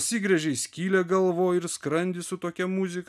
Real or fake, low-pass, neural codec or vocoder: real; 14.4 kHz; none